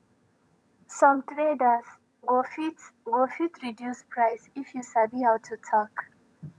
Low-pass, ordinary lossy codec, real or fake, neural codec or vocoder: none; none; fake; vocoder, 22.05 kHz, 80 mel bands, HiFi-GAN